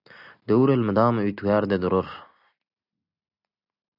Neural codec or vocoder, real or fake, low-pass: none; real; 5.4 kHz